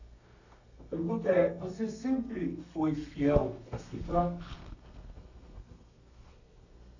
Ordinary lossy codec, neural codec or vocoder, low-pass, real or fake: none; codec, 32 kHz, 1.9 kbps, SNAC; 7.2 kHz; fake